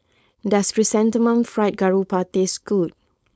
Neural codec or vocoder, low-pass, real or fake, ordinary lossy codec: codec, 16 kHz, 4.8 kbps, FACodec; none; fake; none